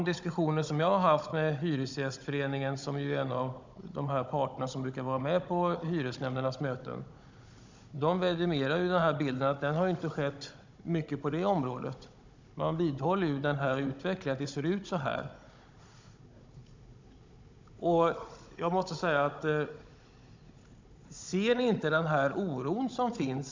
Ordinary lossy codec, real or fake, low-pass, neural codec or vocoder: MP3, 64 kbps; fake; 7.2 kHz; codec, 16 kHz, 16 kbps, FunCodec, trained on Chinese and English, 50 frames a second